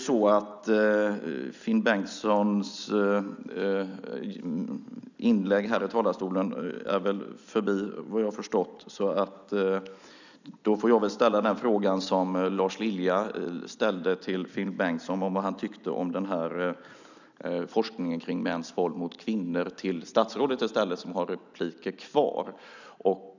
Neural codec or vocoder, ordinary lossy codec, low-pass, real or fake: none; none; 7.2 kHz; real